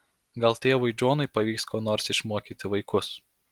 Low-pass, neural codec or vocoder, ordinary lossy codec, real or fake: 19.8 kHz; none; Opus, 24 kbps; real